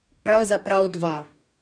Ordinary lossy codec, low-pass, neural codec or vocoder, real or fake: none; 9.9 kHz; codec, 44.1 kHz, 2.6 kbps, DAC; fake